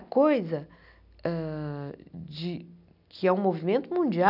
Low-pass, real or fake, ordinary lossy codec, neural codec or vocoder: 5.4 kHz; real; none; none